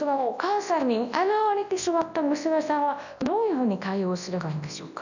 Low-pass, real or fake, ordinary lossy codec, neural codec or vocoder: 7.2 kHz; fake; none; codec, 24 kHz, 0.9 kbps, WavTokenizer, large speech release